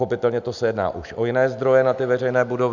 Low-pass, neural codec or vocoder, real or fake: 7.2 kHz; none; real